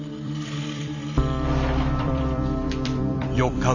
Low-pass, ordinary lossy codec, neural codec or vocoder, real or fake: 7.2 kHz; none; vocoder, 44.1 kHz, 128 mel bands every 256 samples, BigVGAN v2; fake